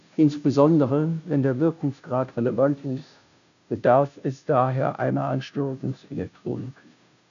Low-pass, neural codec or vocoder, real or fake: 7.2 kHz; codec, 16 kHz, 0.5 kbps, FunCodec, trained on Chinese and English, 25 frames a second; fake